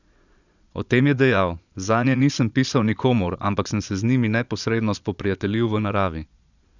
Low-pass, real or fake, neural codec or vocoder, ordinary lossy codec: 7.2 kHz; fake; vocoder, 22.05 kHz, 80 mel bands, Vocos; none